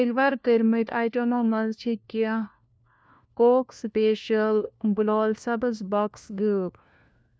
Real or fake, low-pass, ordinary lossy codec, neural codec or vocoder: fake; none; none; codec, 16 kHz, 1 kbps, FunCodec, trained on LibriTTS, 50 frames a second